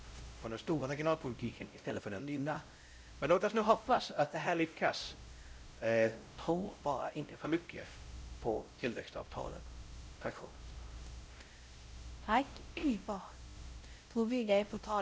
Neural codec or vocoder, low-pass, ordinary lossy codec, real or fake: codec, 16 kHz, 0.5 kbps, X-Codec, WavLM features, trained on Multilingual LibriSpeech; none; none; fake